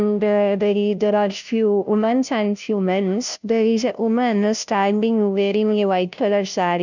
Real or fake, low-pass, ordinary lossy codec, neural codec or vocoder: fake; 7.2 kHz; none; codec, 16 kHz, 0.5 kbps, FunCodec, trained on Chinese and English, 25 frames a second